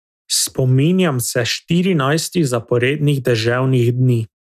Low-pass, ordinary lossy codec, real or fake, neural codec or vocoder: 14.4 kHz; none; real; none